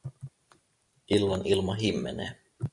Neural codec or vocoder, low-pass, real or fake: none; 10.8 kHz; real